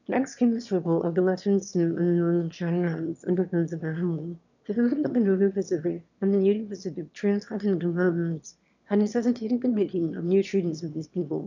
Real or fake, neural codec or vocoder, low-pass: fake; autoencoder, 22.05 kHz, a latent of 192 numbers a frame, VITS, trained on one speaker; 7.2 kHz